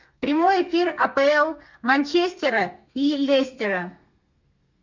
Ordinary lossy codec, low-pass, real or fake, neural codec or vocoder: MP3, 64 kbps; 7.2 kHz; fake; codec, 32 kHz, 1.9 kbps, SNAC